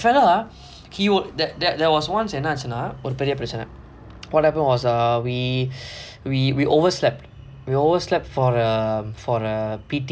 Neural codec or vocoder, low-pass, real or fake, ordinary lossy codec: none; none; real; none